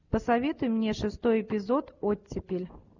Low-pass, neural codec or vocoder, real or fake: 7.2 kHz; none; real